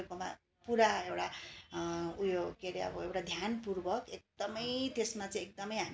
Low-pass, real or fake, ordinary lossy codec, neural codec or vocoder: none; real; none; none